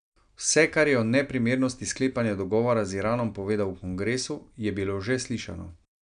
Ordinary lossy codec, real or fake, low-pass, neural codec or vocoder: none; real; 9.9 kHz; none